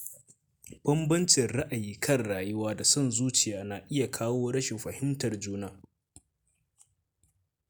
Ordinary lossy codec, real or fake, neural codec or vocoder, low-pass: none; real; none; none